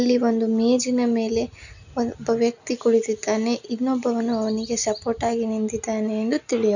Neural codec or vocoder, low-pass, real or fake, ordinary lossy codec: none; 7.2 kHz; real; none